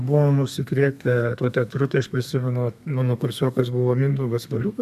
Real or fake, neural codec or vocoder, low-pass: fake; codec, 32 kHz, 1.9 kbps, SNAC; 14.4 kHz